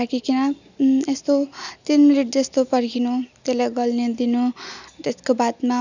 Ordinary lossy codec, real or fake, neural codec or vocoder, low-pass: none; real; none; 7.2 kHz